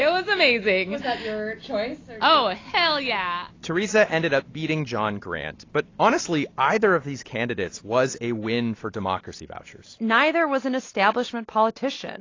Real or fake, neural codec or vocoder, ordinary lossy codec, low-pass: real; none; AAC, 32 kbps; 7.2 kHz